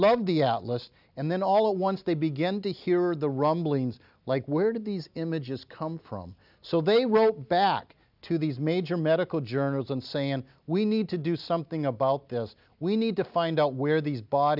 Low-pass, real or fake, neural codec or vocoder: 5.4 kHz; real; none